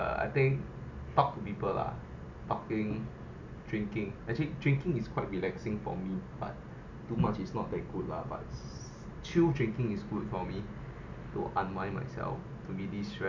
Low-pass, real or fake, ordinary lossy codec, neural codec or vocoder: 7.2 kHz; real; none; none